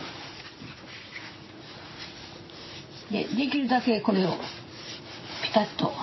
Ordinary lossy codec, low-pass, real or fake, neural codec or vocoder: MP3, 24 kbps; 7.2 kHz; fake; vocoder, 44.1 kHz, 80 mel bands, Vocos